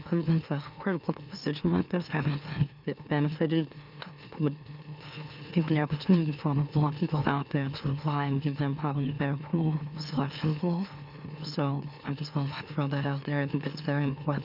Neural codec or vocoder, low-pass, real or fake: autoencoder, 44.1 kHz, a latent of 192 numbers a frame, MeloTTS; 5.4 kHz; fake